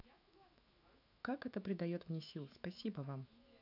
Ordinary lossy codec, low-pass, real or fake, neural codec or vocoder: none; 5.4 kHz; fake; autoencoder, 48 kHz, 128 numbers a frame, DAC-VAE, trained on Japanese speech